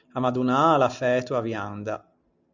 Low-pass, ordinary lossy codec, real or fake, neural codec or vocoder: 7.2 kHz; Opus, 64 kbps; real; none